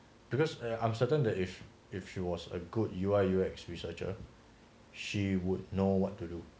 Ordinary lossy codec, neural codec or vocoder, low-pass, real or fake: none; none; none; real